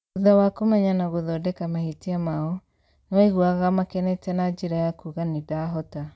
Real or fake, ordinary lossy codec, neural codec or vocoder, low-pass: real; none; none; none